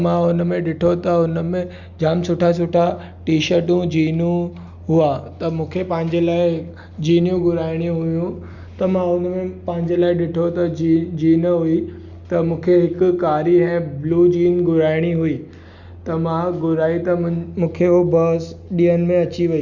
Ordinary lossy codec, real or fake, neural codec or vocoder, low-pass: Opus, 64 kbps; real; none; 7.2 kHz